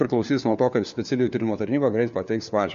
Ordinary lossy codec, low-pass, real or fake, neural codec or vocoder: MP3, 48 kbps; 7.2 kHz; fake; codec, 16 kHz, 4 kbps, FunCodec, trained on LibriTTS, 50 frames a second